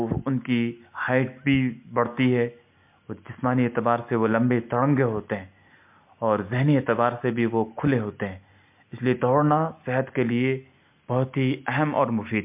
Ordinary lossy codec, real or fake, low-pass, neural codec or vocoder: MP3, 32 kbps; real; 3.6 kHz; none